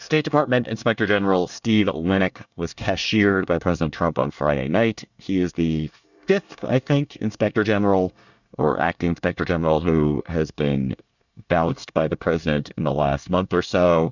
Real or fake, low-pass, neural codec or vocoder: fake; 7.2 kHz; codec, 24 kHz, 1 kbps, SNAC